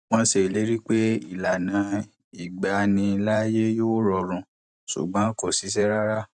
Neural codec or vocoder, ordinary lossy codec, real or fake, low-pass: vocoder, 44.1 kHz, 128 mel bands every 512 samples, BigVGAN v2; none; fake; 10.8 kHz